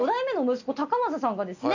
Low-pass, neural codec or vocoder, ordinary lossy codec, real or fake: 7.2 kHz; none; none; real